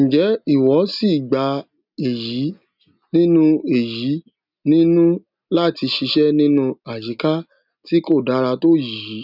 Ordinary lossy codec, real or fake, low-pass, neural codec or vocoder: none; real; 5.4 kHz; none